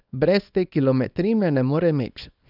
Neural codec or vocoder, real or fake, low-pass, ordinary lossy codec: codec, 24 kHz, 0.9 kbps, WavTokenizer, small release; fake; 5.4 kHz; none